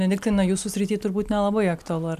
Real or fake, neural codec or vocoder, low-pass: real; none; 14.4 kHz